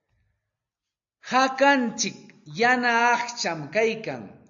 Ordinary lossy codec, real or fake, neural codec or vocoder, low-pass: AAC, 64 kbps; real; none; 7.2 kHz